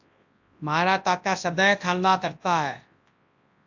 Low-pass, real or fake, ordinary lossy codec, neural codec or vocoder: 7.2 kHz; fake; AAC, 48 kbps; codec, 24 kHz, 0.9 kbps, WavTokenizer, large speech release